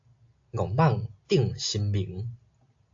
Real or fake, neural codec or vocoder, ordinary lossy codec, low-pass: real; none; AAC, 48 kbps; 7.2 kHz